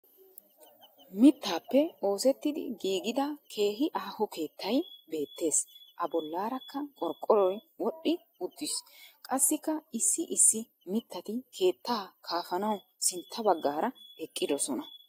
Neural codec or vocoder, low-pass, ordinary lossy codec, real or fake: none; 19.8 kHz; AAC, 48 kbps; real